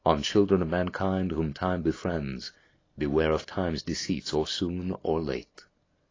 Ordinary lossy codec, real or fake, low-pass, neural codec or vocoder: AAC, 32 kbps; real; 7.2 kHz; none